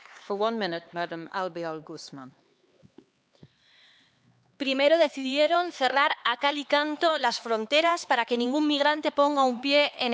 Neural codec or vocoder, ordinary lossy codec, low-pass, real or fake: codec, 16 kHz, 4 kbps, X-Codec, HuBERT features, trained on LibriSpeech; none; none; fake